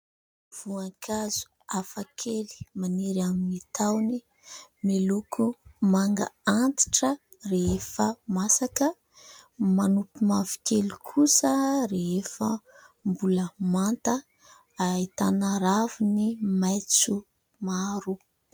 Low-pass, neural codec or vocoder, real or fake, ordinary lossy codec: 19.8 kHz; none; real; MP3, 96 kbps